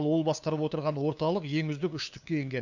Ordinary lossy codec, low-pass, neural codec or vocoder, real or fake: none; 7.2 kHz; codec, 16 kHz, 2 kbps, FunCodec, trained on LibriTTS, 25 frames a second; fake